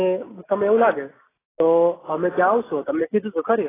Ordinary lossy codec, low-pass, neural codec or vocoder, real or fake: AAC, 16 kbps; 3.6 kHz; none; real